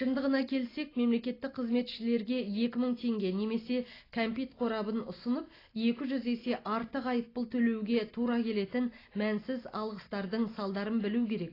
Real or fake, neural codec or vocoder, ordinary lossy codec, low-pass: real; none; AAC, 24 kbps; 5.4 kHz